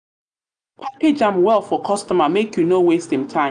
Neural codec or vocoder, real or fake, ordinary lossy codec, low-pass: none; real; none; 9.9 kHz